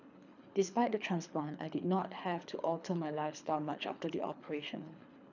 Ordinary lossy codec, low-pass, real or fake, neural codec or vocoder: none; 7.2 kHz; fake; codec, 24 kHz, 3 kbps, HILCodec